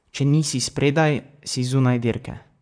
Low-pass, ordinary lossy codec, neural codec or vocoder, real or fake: 9.9 kHz; none; vocoder, 44.1 kHz, 128 mel bands, Pupu-Vocoder; fake